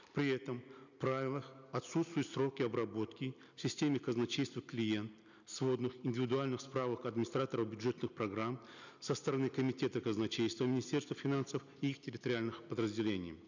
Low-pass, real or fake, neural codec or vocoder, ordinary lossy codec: 7.2 kHz; real; none; none